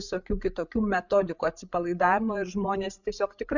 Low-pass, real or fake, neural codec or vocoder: 7.2 kHz; fake; codec, 16 kHz, 8 kbps, FreqCodec, larger model